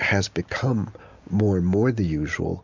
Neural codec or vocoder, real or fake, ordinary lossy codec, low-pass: none; real; MP3, 64 kbps; 7.2 kHz